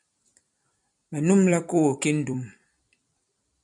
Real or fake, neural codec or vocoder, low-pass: fake; vocoder, 44.1 kHz, 128 mel bands every 256 samples, BigVGAN v2; 10.8 kHz